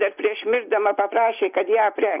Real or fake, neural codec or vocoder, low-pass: real; none; 3.6 kHz